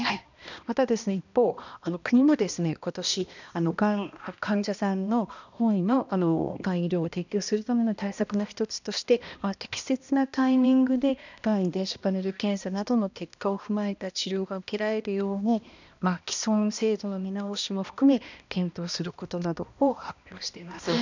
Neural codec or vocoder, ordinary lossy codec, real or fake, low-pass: codec, 16 kHz, 1 kbps, X-Codec, HuBERT features, trained on balanced general audio; none; fake; 7.2 kHz